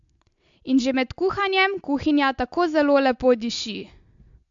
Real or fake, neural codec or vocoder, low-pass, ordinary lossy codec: real; none; 7.2 kHz; AAC, 64 kbps